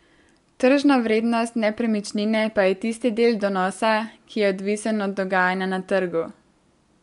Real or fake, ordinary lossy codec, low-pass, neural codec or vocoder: real; MP3, 64 kbps; 10.8 kHz; none